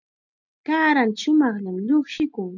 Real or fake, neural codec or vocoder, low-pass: real; none; 7.2 kHz